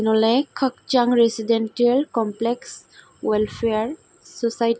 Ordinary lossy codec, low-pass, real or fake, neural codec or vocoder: none; none; real; none